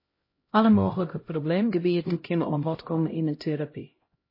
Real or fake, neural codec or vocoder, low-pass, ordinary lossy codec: fake; codec, 16 kHz, 0.5 kbps, X-Codec, HuBERT features, trained on LibriSpeech; 5.4 kHz; MP3, 24 kbps